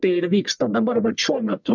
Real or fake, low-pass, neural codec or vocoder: fake; 7.2 kHz; codec, 44.1 kHz, 1.7 kbps, Pupu-Codec